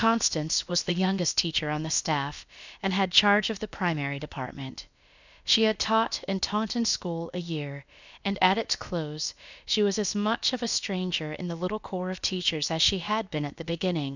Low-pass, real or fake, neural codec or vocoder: 7.2 kHz; fake; codec, 16 kHz, about 1 kbps, DyCAST, with the encoder's durations